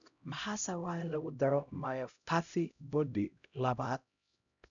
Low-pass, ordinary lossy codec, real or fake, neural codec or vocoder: 7.2 kHz; none; fake; codec, 16 kHz, 0.5 kbps, X-Codec, HuBERT features, trained on LibriSpeech